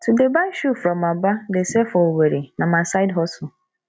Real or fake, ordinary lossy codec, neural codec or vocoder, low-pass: real; none; none; none